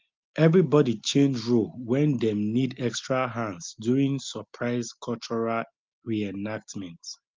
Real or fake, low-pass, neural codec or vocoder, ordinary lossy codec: real; 7.2 kHz; none; Opus, 32 kbps